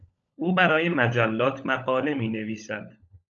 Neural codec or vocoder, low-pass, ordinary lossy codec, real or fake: codec, 16 kHz, 16 kbps, FunCodec, trained on LibriTTS, 50 frames a second; 7.2 kHz; AAC, 64 kbps; fake